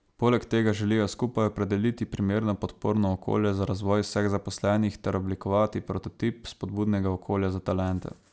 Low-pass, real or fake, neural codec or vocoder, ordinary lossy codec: none; real; none; none